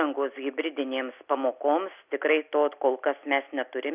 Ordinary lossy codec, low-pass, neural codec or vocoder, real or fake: Opus, 64 kbps; 3.6 kHz; none; real